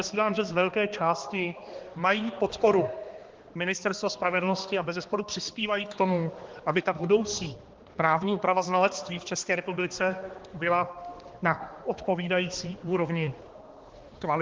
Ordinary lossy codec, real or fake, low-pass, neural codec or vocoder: Opus, 16 kbps; fake; 7.2 kHz; codec, 16 kHz, 2 kbps, X-Codec, HuBERT features, trained on balanced general audio